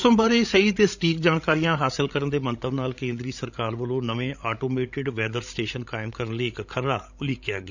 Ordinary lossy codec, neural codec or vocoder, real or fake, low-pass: none; codec, 16 kHz, 16 kbps, FreqCodec, larger model; fake; 7.2 kHz